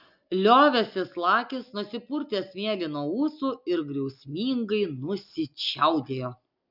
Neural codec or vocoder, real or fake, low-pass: none; real; 5.4 kHz